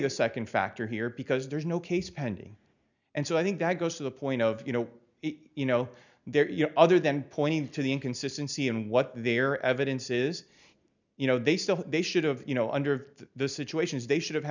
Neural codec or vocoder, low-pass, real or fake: none; 7.2 kHz; real